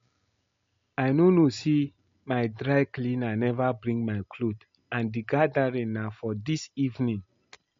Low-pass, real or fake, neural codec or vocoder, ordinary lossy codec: 7.2 kHz; real; none; MP3, 48 kbps